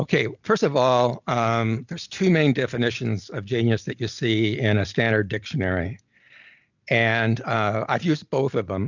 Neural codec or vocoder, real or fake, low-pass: none; real; 7.2 kHz